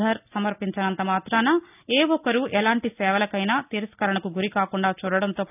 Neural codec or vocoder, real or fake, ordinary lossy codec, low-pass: none; real; none; 3.6 kHz